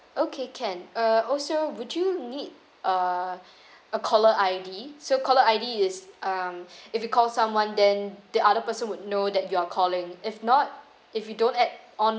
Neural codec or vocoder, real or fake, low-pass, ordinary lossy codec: none; real; none; none